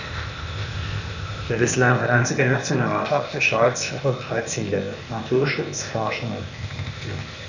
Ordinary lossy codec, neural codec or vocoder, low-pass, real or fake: none; codec, 16 kHz, 0.8 kbps, ZipCodec; 7.2 kHz; fake